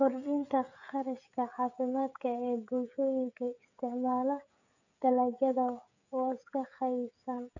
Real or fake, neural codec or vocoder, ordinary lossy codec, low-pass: fake; codec, 16 kHz, 16 kbps, FreqCodec, smaller model; none; 7.2 kHz